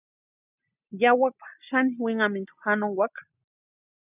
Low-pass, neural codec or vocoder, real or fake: 3.6 kHz; none; real